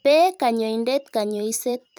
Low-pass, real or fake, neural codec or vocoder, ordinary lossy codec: none; fake; vocoder, 44.1 kHz, 128 mel bands every 512 samples, BigVGAN v2; none